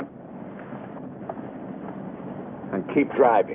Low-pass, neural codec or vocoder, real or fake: 3.6 kHz; none; real